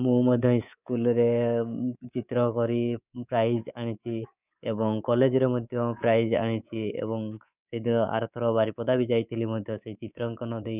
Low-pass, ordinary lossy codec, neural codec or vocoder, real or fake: 3.6 kHz; none; codec, 24 kHz, 3.1 kbps, DualCodec; fake